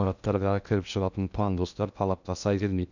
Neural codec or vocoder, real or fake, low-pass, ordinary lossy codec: codec, 16 kHz in and 24 kHz out, 0.6 kbps, FocalCodec, streaming, 2048 codes; fake; 7.2 kHz; none